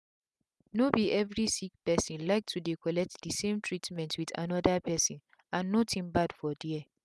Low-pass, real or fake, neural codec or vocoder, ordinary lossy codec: none; real; none; none